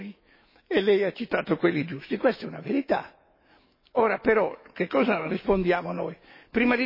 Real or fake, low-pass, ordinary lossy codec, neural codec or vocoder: fake; 5.4 kHz; MP3, 24 kbps; autoencoder, 48 kHz, 128 numbers a frame, DAC-VAE, trained on Japanese speech